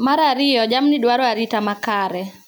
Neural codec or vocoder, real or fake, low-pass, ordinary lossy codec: none; real; none; none